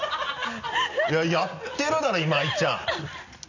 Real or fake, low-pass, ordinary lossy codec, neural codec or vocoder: real; 7.2 kHz; none; none